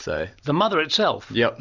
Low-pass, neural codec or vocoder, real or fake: 7.2 kHz; none; real